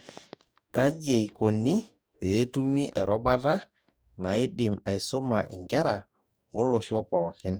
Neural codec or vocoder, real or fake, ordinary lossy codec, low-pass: codec, 44.1 kHz, 2.6 kbps, DAC; fake; none; none